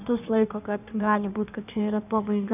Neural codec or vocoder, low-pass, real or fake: codec, 16 kHz in and 24 kHz out, 1.1 kbps, FireRedTTS-2 codec; 3.6 kHz; fake